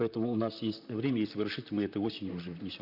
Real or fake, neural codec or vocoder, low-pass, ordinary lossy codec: fake; vocoder, 44.1 kHz, 128 mel bands, Pupu-Vocoder; 5.4 kHz; none